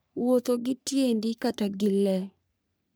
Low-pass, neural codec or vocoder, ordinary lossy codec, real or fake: none; codec, 44.1 kHz, 3.4 kbps, Pupu-Codec; none; fake